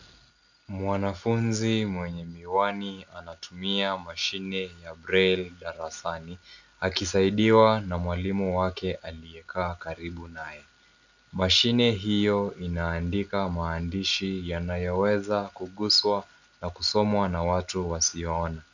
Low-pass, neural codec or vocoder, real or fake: 7.2 kHz; none; real